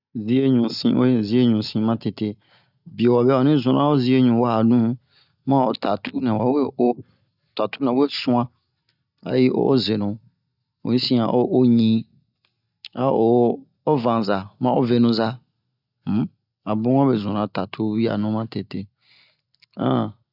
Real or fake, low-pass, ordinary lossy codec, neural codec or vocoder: real; 5.4 kHz; none; none